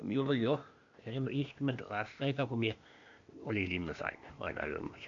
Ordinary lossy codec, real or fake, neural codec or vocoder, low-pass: AAC, 64 kbps; fake; codec, 16 kHz, 0.8 kbps, ZipCodec; 7.2 kHz